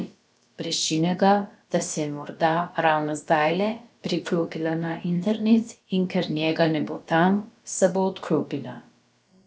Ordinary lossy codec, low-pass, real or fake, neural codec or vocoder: none; none; fake; codec, 16 kHz, about 1 kbps, DyCAST, with the encoder's durations